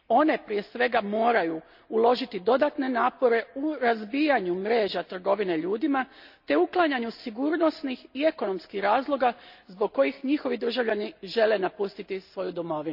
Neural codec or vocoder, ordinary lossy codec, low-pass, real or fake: none; none; 5.4 kHz; real